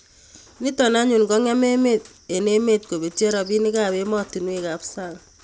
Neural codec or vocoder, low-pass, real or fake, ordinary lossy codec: none; none; real; none